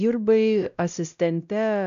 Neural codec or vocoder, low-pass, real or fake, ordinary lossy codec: codec, 16 kHz, 0.5 kbps, X-Codec, WavLM features, trained on Multilingual LibriSpeech; 7.2 kHz; fake; AAC, 96 kbps